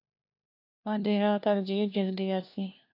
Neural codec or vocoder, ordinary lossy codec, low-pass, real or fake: codec, 16 kHz, 1 kbps, FunCodec, trained on LibriTTS, 50 frames a second; AAC, 48 kbps; 5.4 kHz; fake